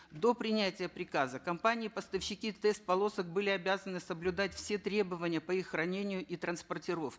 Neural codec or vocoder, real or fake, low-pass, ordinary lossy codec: none; real; none; none